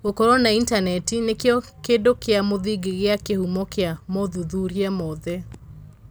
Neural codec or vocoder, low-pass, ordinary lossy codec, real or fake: none; none; none; real